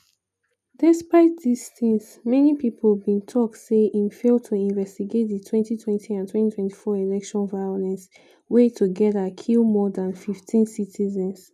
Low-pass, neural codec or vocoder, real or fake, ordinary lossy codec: 14.4 kHz; none; real; none